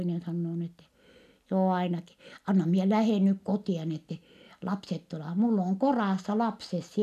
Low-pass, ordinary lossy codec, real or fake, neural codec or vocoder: 14.4 kHz; none; real; none